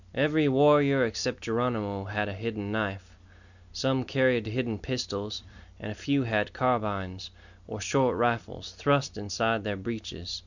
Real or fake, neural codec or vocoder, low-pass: real; none; 7.2 kHz